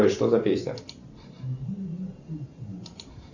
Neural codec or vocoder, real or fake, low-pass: vocoder, 44.1 kHz, 128 mel bands every 512 samples, BigVGAN v2; fake; 7.2 kHz